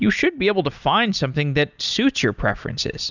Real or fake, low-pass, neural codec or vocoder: real; 7.2 kHz; none